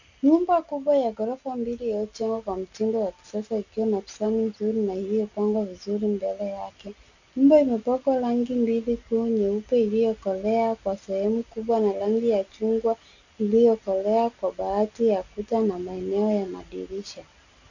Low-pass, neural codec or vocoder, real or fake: 7.2 kHz; none; real